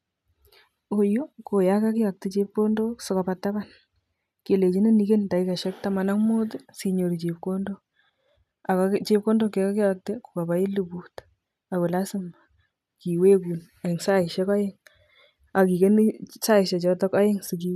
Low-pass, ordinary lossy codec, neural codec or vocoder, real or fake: 14.4 kHz; none; none; real